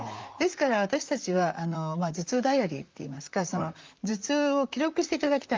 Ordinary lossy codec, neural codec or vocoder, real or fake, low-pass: Opus, 24 kbps; vocoder, 44.1 kHz, 128 mel bands, Pupu-Vocoder; fake; 7.2 kHz